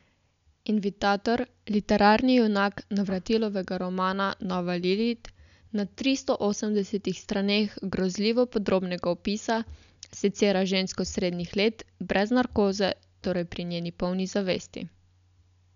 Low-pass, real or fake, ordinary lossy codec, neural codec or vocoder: 7.2 kHz; real; none; none